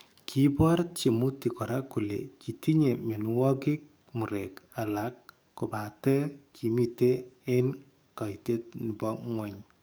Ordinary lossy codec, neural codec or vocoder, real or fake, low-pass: none; codec, 44.1 kHz, 7.8 kbps, DAC; fake; none